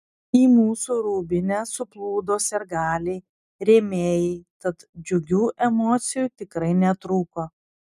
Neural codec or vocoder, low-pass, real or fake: none; 14.4 kHz; real